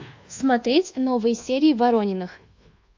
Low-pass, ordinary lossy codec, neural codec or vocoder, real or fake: 7.2 kHz; AAC, 48 kbps; codec, 24 kHz, 1.2 kbps, DualCodec; fake